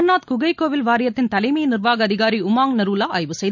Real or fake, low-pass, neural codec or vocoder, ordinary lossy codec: real; 7.2 kHz; none; none